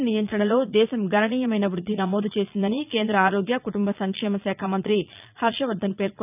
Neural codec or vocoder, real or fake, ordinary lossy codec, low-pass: vocoder, 22.05 kHz, 80 mel bands, Vocos; fake; none; 3.6 kHz